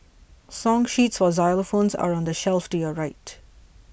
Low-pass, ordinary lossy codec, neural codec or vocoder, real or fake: none; none; none; real